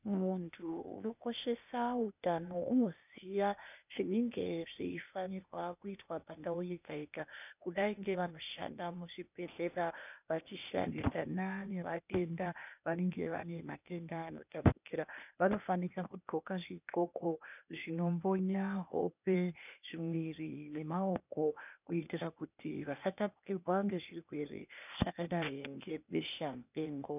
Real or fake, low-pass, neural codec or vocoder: fake; 3.6 kHz; codec, 16 kHz, 0.8 kbps, ZipCodec